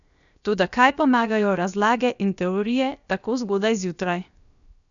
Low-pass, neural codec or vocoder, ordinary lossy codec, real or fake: 7.2 kHz; codec, 16 kHz, 0.7 kbps, FocalCodec; none; fake